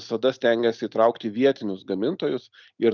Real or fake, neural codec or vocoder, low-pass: real; none; 7.2 kHz